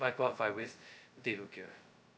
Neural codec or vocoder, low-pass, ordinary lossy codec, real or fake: codec, 16 kHz, 0.2 kbps, FocalCodec; none; none; fake